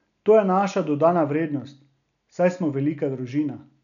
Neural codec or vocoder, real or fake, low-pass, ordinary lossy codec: none; real; 7.2 kHz; none